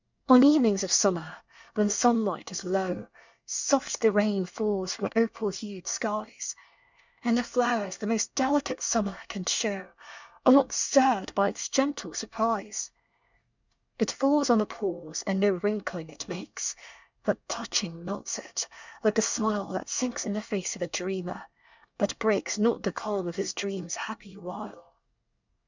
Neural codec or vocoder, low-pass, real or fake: codec, 24 kHz, 1 kbps, SNAC; 7.2 kHz; fake